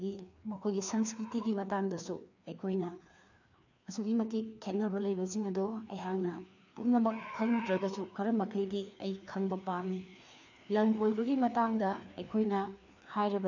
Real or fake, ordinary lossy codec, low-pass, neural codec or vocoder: fake; none; 7.2 kHz; codec, 16 kHz, 2 kbps, FreqCodec, larger model